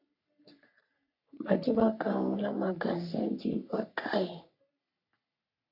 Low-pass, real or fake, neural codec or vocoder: 5.4 kHz; fake; codec, 44.1 kHz, 3.4 kbps, Pupu-Codec